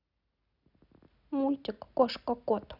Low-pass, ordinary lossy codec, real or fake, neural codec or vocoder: 5.4 kHz; none; real; none